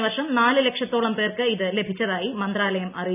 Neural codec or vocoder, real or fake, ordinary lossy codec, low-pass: none; real; none; 3.6 kHz